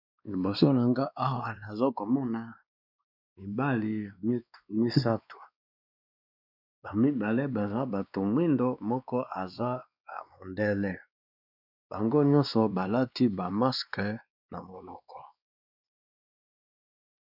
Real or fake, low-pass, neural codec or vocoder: fake; 5.4 kHz; codec, 16 kHz, 2 kbps, X-Codec, WavLM features, trained on Multilingual LibriSpeech